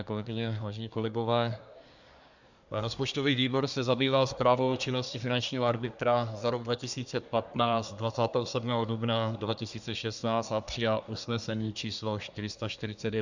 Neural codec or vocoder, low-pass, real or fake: codec, 24 kHz, 1 kbps, SNAC; 7.2 kHz; fake